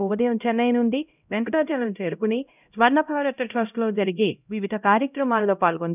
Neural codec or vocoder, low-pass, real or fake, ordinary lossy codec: codec, 16 kHz, 0.5 kbps, X-Codec, HuBERT features, trained on LibriSpeech; 3.6 kHz; fake; none